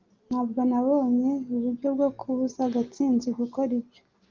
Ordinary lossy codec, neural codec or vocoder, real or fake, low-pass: Opus, 32 kbps; none; real; 7.2 kHz